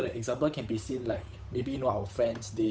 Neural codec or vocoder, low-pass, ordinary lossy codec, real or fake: codec, 16 kHz, 8 kbps, FunCodec, trained on Chinese and English, 25 frames a second; none; none; fake